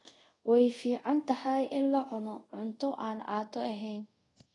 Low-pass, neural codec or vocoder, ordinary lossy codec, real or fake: 10.8 kHz; codec, 24 kHz, 0.5 kbps, DualCodec; AAC, 32 kbps; fake